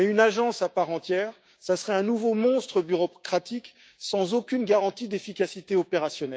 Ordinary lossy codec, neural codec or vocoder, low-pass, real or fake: none; codec, 16 kHz, 6 kbps, DAC; none; fake